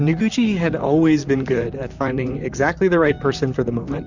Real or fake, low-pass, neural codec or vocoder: fake; 7.2 kHz; vocoder, 44.1 kHz, 128 mel bands, Pupu-Vocoder